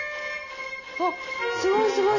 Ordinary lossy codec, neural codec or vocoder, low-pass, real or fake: none; none; 7.2 kHz; real